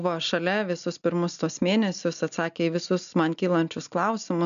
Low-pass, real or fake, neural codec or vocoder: 7.2 kHz; real; none